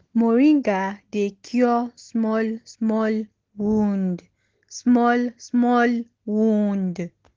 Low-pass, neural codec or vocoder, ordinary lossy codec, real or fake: 7.2 kHz; none; Opus, 16 kbps; real